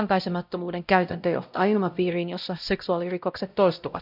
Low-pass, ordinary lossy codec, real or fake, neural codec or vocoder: 5.4 kHz; none; fake; codec, 16 kHz, 0.5 kbps, X-Codec, WavLM features, trained on Multilingual LibriSpeech